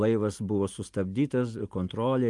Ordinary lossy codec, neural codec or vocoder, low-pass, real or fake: Opus, 32 kbps; none; 10.8 kHz; real